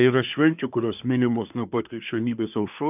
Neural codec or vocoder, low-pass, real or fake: codec, 24 kHz, 1 kbps, SNAC; 3.6 kHz; fake